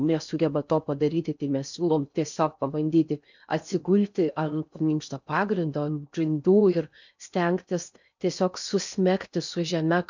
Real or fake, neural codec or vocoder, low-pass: fake; codec, 16 kHz in and 24 kHz out, 0.6 kbps, FocalCodec, streaming, 4096 codes; 7.2 kHz